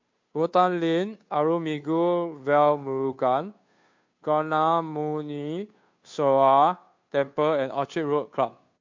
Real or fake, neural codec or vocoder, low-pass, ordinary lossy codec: fake; codec, 16 kHz, 2 kbps, FunCodec, trained on Chinese and English, 25 frames a second; 7.2 kHz; MP3, 48 kbps